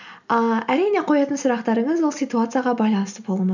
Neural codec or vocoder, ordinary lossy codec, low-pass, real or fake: none; none; 7.2 kHz; real